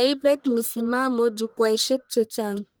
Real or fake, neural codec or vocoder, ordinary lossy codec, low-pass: fake; codec, 44.1 kHz, 1.7 kbps, Pupu-Codec; none; none